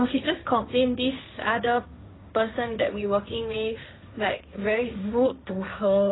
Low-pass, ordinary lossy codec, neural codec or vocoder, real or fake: 7.2 kHz; AAC, 16 kbps; codec, 16 kHz, 1.1 kbps, Voila-Tokenizer; fake